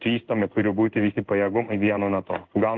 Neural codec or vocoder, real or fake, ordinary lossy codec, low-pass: codec, 16 kHz in and 24 kHz out, 1 kbps, XY-Tokenizer; fake; Opus, 16 kbps; 7.2 kHz